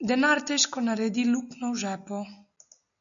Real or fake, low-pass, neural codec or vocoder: real; 7.2 kHz; none